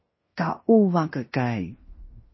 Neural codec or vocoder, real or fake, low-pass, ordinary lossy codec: codec, 16 kHz in and 24 kHz out, 0.9 kbps, LongCat-Audio-Codec, fine tuned four codebook decoder; fake; 7.2 kHz; MP3, 24 kbps